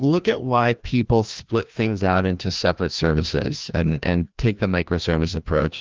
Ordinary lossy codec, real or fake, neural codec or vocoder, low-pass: Opus, 32 kbps; fake; codec, 16 kHz, 1 kbps, FreqCodec, larger model; 7.2 kHz